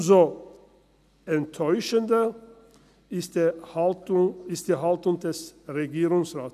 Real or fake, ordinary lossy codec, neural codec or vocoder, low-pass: real; none; none; 14.4 kHz